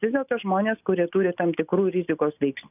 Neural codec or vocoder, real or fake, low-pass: none; real; 3.6 kHz